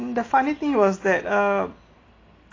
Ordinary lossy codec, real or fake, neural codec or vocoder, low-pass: AAC, 32 kbps; real; none; 7.2 kHz